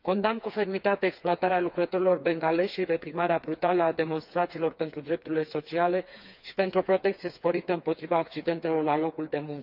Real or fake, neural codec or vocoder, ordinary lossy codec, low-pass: fake; codec, 16 kHz, 4 kbps, FreqCodec, smaller model; none; 5.4 kHz